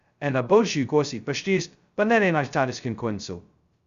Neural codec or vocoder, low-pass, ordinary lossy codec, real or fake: codec, 16 kHz, 0.2 kbps, FocalCodec; 7.2 kHz; Opus, 64 kbps; fake